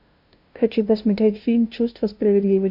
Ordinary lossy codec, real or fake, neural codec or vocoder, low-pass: MP3, 48 kbps; fake; codec, 16 kHz, 0.5 kbps, FunCodec, trained on LibriTTS, 25 frames a second; 5.4 kHz